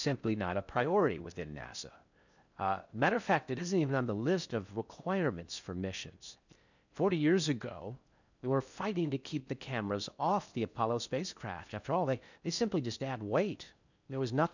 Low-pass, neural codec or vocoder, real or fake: 7.2 kHz; codec, 16 kHz in and 24 kHz out, 0.6 kbps, FocalCodec, streaming, 4096 codes; fake